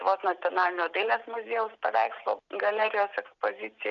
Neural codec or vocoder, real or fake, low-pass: none; real; 7.2 kHz